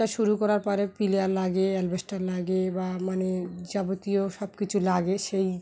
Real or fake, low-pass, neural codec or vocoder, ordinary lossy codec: real; none; none; none